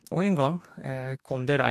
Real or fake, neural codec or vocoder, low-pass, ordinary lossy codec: fake; codec, 44.1 kHz, 2.6 kbps, DAC; 14.4 kHz; none